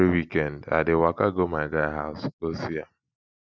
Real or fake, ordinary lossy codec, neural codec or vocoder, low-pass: real; none; none; none